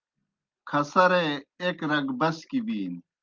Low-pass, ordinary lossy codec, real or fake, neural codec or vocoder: 7.2 kHz; Opus, 32 kbps; real; none